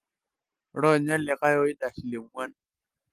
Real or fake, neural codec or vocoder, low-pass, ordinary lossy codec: real; none; 14.4 kHz; Opus, 24 kbps